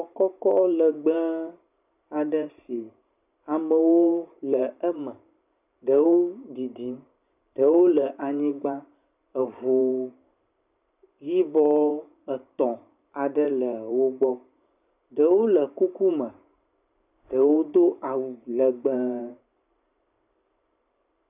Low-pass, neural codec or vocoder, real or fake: 3.6 kHz; vocoder, 44.1 kHz, 128 mel bands every 512 samples, BigVGAN v2; fake